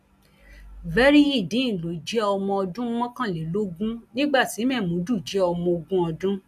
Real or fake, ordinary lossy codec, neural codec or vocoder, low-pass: real; none; none; 14.4 kHz